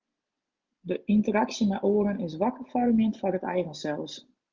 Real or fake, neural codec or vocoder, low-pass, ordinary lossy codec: real; none; 7.2 kHz; Opus, 32 kbps